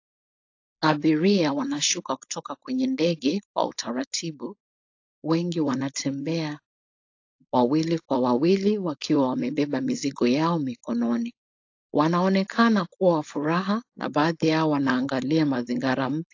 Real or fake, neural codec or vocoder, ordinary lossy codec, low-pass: fake; codec, 16 kHz, 4.8 kbps, FACodec; AAC, 48 kbps; 7.2 kHz